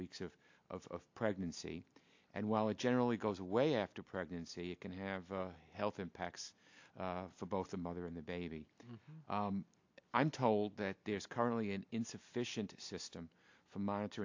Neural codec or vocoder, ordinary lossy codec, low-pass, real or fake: none; AAC, 48 kbps; 7.2 kHz; real